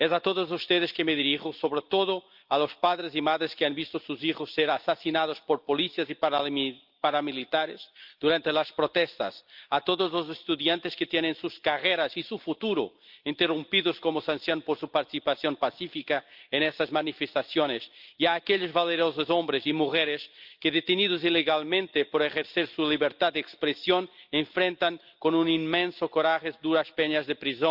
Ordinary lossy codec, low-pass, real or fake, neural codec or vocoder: Opus, 24 kbps; 5.4 kHz; real; none